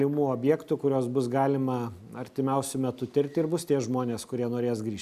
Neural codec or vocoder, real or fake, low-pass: none; real; 14.4 kHz